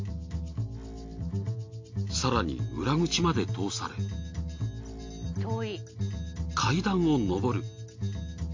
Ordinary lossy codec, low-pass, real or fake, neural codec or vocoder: MP3, 48 kbps; 7.2 kHz; real; none